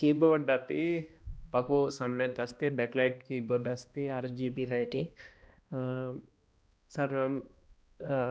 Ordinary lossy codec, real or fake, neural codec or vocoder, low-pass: none; fake; codec, 16 kHz, 1 kbps, X-Codec, HuBERT features, trained on balanced general audio; none